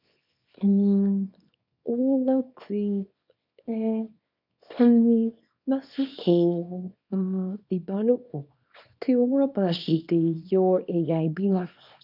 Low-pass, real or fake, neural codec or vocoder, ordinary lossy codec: 5.4 kHz; fake; codec, 24 kHz, 0.9 kbps, WavTokenizer, small release; none